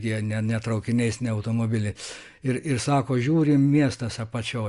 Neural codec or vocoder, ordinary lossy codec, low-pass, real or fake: none; Opus, 64 kbps; 10.8 kHz; real